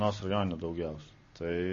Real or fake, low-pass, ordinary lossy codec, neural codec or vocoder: real; 7.2 kHz; MP3, 32 kbps; none